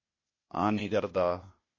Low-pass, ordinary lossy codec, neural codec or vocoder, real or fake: 7.2 kHz; MP3, 32 kbps; codec, 16 kHz, 0.8 kbps, ZipCodec; fake